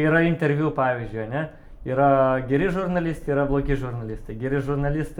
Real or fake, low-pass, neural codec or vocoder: fake; 19.8 kHz; vocoder, 44.1 kHz, 128 mel bands every 256 samples, BigVGAN v2